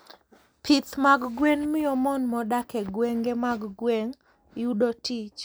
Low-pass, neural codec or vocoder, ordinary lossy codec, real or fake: none; none; none; real